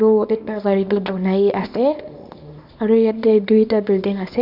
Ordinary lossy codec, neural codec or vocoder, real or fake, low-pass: none; codec, 24 kHz, 0.9 kbps, WavTokenizer, small release; fake; 5.4 kHz